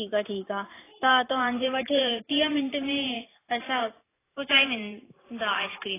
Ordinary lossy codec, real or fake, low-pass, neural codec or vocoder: AAC, 16 kbps; fake; 3.6 kHz; vocoder, 44.1 kHz, 128 mel bands every 512 samples, BigVGAN v2